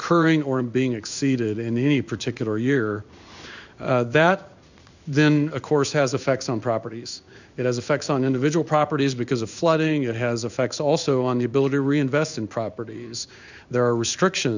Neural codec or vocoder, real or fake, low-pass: codec, 16 kHz in and 24 kHz out, 1 kbps, XY-Tokenizer; fake; 7.2 kHz